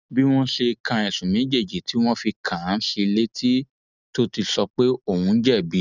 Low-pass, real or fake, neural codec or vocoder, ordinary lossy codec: 7.2 kHz; real; none; none